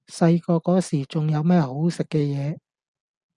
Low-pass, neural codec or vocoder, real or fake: 10.8 kHz; none; real